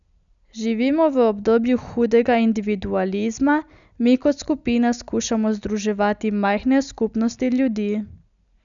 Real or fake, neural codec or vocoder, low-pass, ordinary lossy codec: real; none; 7.2 kHz; none